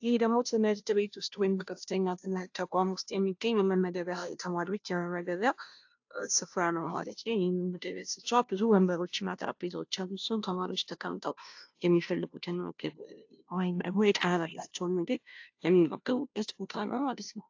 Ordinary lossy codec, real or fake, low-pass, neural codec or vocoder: AAC, 48 kbps; fake; 7.2 kHz; codec, 16 kHz, 0.5 kbps, FunCodec, trained on Chinese and English, 25 frames a second